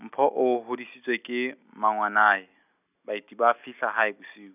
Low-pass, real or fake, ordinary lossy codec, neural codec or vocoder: 3.6 kHz; real; none; none